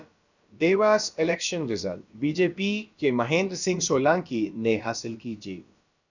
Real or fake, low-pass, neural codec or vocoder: fake; 7.2 kHz; codec, 16 kHz, about 1 kbps, DyCAST, with the encoder's durations